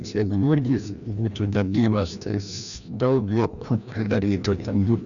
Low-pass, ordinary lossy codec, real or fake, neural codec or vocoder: 7.2 kHz; MP3, 96 kbps; fake; codec, 16 kHz, 1 kbps, FreqCodec, larger model